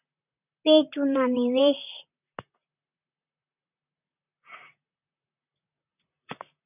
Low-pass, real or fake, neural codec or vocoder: 3.6 kHz; fake; vocoder, 44.1 kHz, 128 mel bands, Pupu-Vocoder